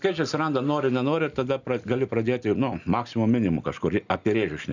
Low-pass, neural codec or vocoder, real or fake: 7.2 kHz; none; real